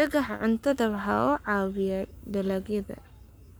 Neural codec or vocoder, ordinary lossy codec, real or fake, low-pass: codec, 44.1 kHz, 7.8 kbps, Pupu-Codec; none; fake; none